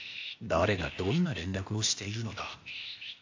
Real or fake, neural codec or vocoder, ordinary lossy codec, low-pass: fake; codec, 16 kHz, 0.8 kbps, ZipCodec; AAC, 48 kbps; 7.2 kHz